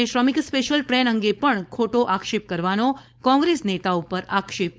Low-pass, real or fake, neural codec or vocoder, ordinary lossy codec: none; fake; codec, 16 kHz, 4.8 kbps, FACodec; none